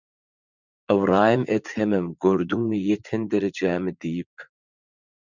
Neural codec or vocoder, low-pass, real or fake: vocoder, 44.1 kHz, 80 mel bands, Vocos; 7.2 kHz; fake